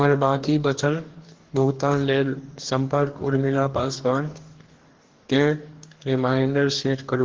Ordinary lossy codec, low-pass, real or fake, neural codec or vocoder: Opus, 16 kbps; 7.2 kHz; fake; codec, 44.1 kHz, 2.6 kbps, DAC